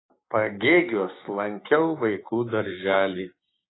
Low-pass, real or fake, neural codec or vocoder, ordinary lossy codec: 7.2 kHz; fake; codec, 16 kHz, 6 kbps, DAC; AAC, 16 kbps